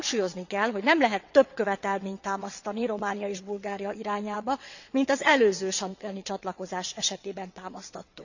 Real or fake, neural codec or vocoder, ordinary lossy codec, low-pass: fake; vocoder, 22.05 kHz, 80 mel bands, WaveNeXt; none; 7.2 kHz